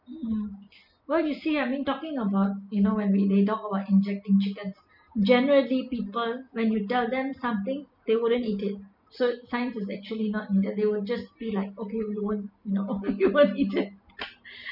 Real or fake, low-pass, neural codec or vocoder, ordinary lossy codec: real; 5.4 kHz; none; none